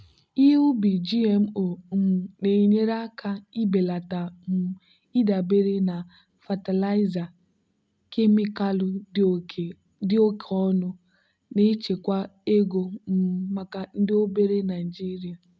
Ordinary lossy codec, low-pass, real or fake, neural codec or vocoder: none; none; real; none